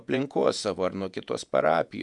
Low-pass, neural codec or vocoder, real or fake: 10.8 kHz; vocoder, 48 kHz, 128 mel bands, Vocos; fake